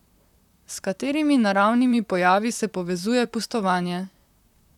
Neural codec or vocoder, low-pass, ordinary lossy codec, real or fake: vocoder, 44.1 kHz, 128 mel bands, Pupu-Vocoder; 19.8 kHz; none; fake